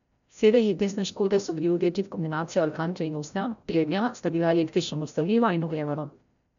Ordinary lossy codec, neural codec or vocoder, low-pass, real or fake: none; codec, 16 kHz, 0.5 kbps, FreqCodec, larger model; 7.2 kHz; fake